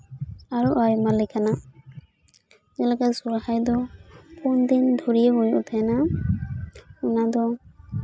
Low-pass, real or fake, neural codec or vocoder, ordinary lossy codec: none; real; none; none